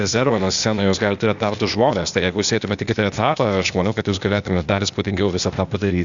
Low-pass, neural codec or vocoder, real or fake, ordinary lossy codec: 7.2 kHz; codec, 16 kHz, 0.8 kbps, ZipCodec; fake; Opus, 64 kbps